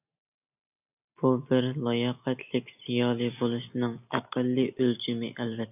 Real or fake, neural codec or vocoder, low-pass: real; none; 3.6 kHz